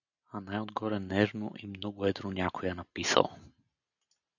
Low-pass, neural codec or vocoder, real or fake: 7.2 kHz; none; real